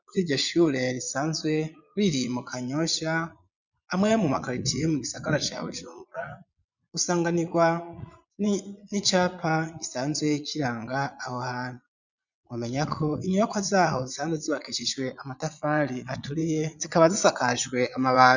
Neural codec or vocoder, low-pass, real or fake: vocoder, 22.05 kHz, 80 mel bands, Vocos; 7.2 kHz; fake